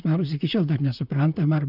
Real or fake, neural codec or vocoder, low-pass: fake; vocoder, 44.1 kHz, 128 mel bands, Pupu-Vocoder; 5.4 kHz